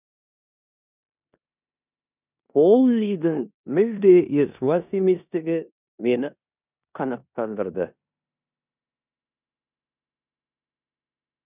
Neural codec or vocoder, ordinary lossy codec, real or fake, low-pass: codec, 16 kHz in and 24 kHz out, 0.9 kbps, LongCat-Audio-Codec, four codebook decoder; none; fake; 3.6 kHz